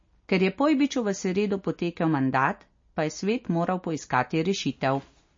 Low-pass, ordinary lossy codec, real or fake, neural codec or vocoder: 7.2 kHz; MP3, 32 kbps; real; none